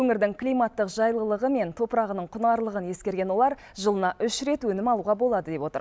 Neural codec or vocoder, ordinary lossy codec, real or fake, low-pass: none; none; real; none